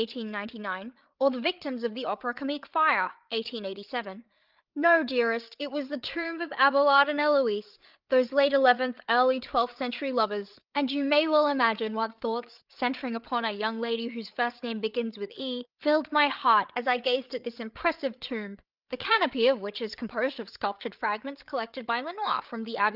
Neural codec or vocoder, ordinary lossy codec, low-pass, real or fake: codec, 16 kHz, 8 kbps, FreqCodec, larger model; Opus, 24 kbps; 5.4 kHz; fake